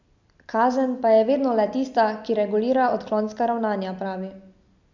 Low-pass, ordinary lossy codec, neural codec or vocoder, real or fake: 7.2 kHz; MP3, 64 kbps; none; real